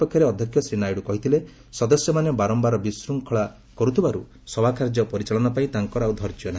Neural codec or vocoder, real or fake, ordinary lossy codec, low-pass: none; real; none; none